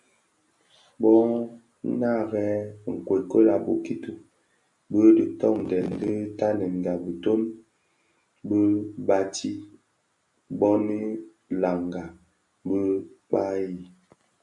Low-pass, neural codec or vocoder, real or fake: 10.8 kHz; none; real